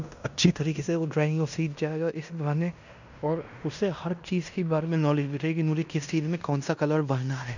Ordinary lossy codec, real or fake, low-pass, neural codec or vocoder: none; fake; 7.2 kHz; codec, 16 kHz in and 24 kHz out, 0.9 kbps, LongCat-Audio-Codec, fine tuned four codebook decoder